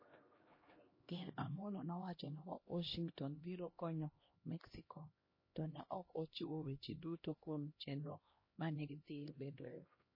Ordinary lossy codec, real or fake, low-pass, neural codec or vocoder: MP3, 24 kbps; fake; 5.4 kHz; codec, 16 kHz, 1 kbps, X-Codec, HuBERT features, trained on LibriSpeech